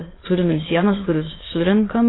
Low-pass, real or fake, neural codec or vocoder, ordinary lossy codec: 7.2 kHz; fake; autoencoder, 22.05 kHz, a latent of 192 numbers a frame, VITS, trained on many speakers; AAC, 16 kbps